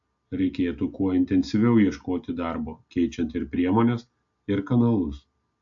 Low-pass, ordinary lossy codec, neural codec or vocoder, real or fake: 7.2 kHz; AAC, 64 kbps; none; real